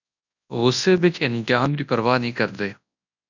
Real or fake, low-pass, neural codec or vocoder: fake; 7.2 kHz; codec, 24 kHz, 0.9 kbps, WavTokenizer, large speech release